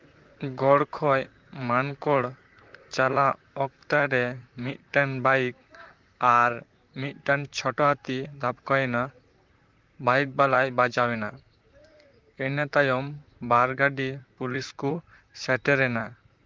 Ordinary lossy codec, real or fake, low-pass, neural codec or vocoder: Opus, 32 kbps; fake; 7.2 kHz; vocoder, 44.1 kHz, 128 mel bands, Pupu-Vocoder